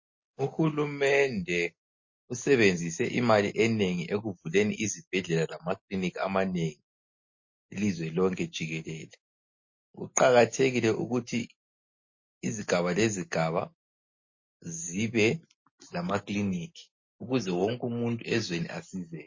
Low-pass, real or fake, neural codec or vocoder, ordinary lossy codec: 7.2 kHz; real; none; MP3, 32 kbps